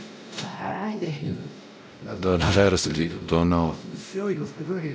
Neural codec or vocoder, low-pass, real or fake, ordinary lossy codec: codec, 16 kHz, 0.5 kbps, X-Codec, WavLM features, trained on Multilingual LibriSpeech; none; fake; none